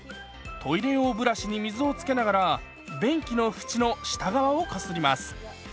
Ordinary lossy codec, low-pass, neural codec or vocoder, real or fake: none; none; none; real